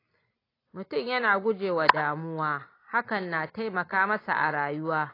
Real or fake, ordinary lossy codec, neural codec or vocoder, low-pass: real; AAC, 24 kbps; none; 5.4 kHz